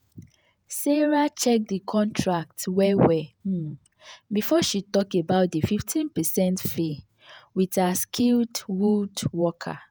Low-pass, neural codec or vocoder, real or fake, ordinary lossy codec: none; vocoder, 48 kHz, 128 mel bands, Vocos; fake; none